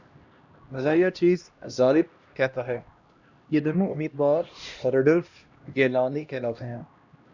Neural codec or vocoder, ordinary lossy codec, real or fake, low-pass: codec, 16 kHz, 1 kbps, X-Codec, HuBERT features, trained on LibriSpeech; Opus, 64 kbps; fake; 7.2 kHz